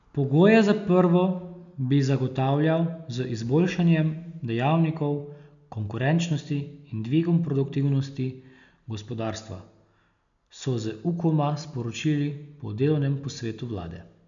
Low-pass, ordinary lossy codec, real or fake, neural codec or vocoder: 7.2 kHz; MP3, 96 kbps; real; none